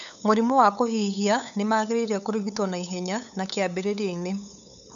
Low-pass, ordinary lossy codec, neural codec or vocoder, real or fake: 7.2 kHz; none; codec, 16 kHz, 8 kbps, FunCodec, trained on LibriTTS, 25 frames a second; fake